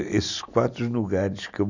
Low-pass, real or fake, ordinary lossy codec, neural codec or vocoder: 7.2 kHz; real; none; none